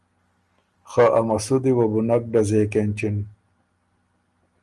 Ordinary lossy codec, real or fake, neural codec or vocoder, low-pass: Opus, 32 kbps; real; none; 10.8 kHz